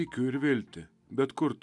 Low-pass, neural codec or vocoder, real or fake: 10.8 kHz; none; real